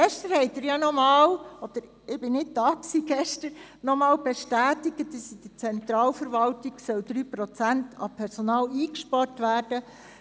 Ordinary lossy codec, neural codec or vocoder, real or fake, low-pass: none; none; real; none